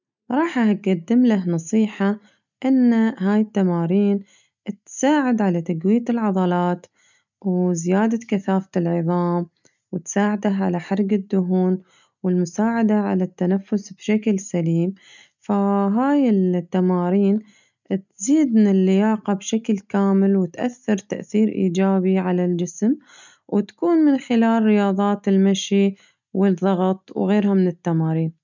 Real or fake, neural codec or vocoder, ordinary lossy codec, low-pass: real; none; none; 7.2 kHz